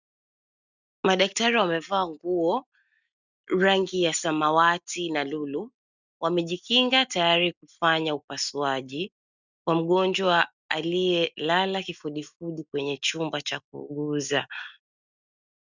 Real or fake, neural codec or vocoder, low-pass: real; none; 7.2 kHz